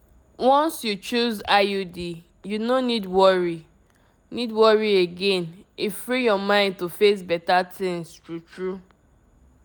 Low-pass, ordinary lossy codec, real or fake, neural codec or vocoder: none; none; real; none